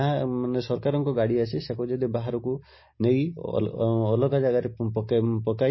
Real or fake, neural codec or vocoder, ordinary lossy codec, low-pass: real; none; MP3, 24 kbps; 7.2 kHz